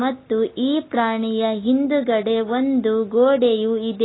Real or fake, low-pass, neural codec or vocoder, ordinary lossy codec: real; 7.2 kHz; none; AAC, 16 kbps